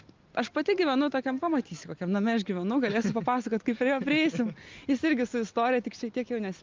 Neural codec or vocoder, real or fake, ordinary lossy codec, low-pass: none; real; Opus, 32 kbps; 7.2 kHz